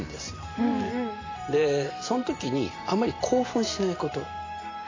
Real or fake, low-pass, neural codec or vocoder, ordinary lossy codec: fake; 7.2 kHz; vocoder, 44.1 kHz, 128 mel bands every 512 samples, BigVGAN v2; AAC, 32 kbps